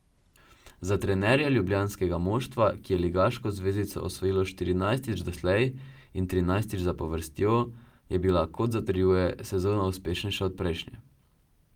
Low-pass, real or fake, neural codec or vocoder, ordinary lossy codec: 19.8 kHz; real; none; Opus, 32 kbps